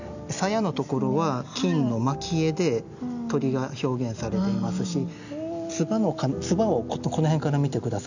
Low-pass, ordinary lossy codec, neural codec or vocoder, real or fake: 7.2 kHz; none; none; real